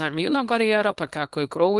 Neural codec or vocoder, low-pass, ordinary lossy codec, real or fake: codec, 24 kHz, 0.9 kbps, WavTokenizer, small release; 10.8 kHz; Opus, 24 kbps; fake